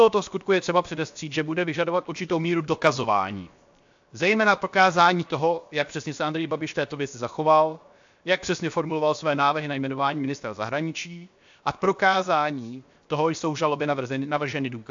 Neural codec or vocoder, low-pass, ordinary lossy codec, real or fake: codec, 16 kHz, 0.7 kbps, FocalCodec; 7.2 kHz; AAC, 64 kbps; fake